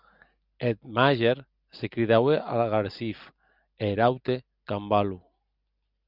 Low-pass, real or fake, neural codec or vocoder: 5.4 kHz; real; none